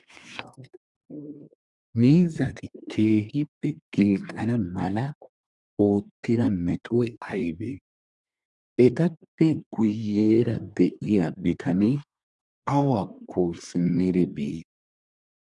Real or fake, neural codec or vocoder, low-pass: fake; codec, 24 kHz, 1 kbps, SNAC; 10.8 kHz